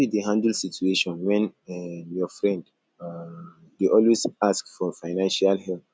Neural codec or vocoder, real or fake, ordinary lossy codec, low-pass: none; real; none; none